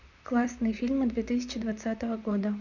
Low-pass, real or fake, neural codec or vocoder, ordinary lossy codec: 7.2 kHz; fake; vocoder, 44.1 kHz, 128 mel bands, Pupu-Vocoder; none